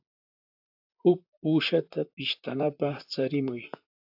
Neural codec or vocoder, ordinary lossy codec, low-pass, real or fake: vocoder, 44.1 kHz, 128 mel bands, Pupu-Vocoder; AAC, 48 kbps; 5.4 kHz; fake